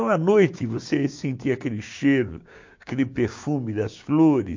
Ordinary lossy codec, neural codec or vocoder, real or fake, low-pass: MP3, 48 kbps; codec, 16 kHz, 6 kbps, DAC; fake; 7.2 kHz